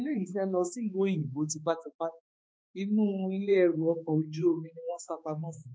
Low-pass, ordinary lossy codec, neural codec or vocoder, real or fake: none; none; codec, 16 kHz, 2 kbps, X-Codec, HuBERT features, trained on balanced general audio; fake